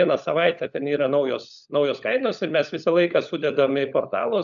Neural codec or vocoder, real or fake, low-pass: codec, 16 kHz, 16 kbps, FunCodec, trained on LibriTTS, 50 frames a second; fake; 7.2 kHz